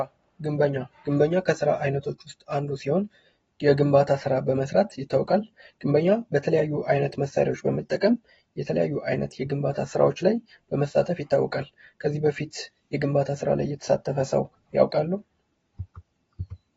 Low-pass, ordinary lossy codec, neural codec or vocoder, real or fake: 19.8 kHz; AAC, 24 kbps; none; real